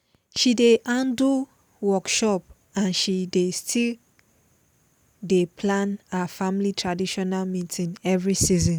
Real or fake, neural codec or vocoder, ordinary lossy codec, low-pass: real; none; none; none